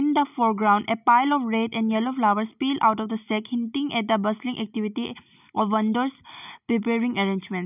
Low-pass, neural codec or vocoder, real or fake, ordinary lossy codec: 3.6 kHz; none; real; none